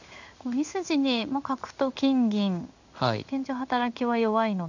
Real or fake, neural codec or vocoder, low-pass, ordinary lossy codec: fake; codec, 16 kHz in and 24 kHz out, 1 kbps, XY-Tokenizer; 7.2 kHz; none